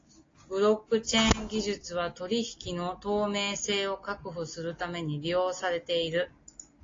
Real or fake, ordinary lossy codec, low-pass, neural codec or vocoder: real; AAC, 32 kbps; 7.2 kHz; none